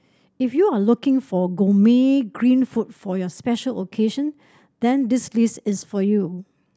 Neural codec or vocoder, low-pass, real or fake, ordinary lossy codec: none; none; real; none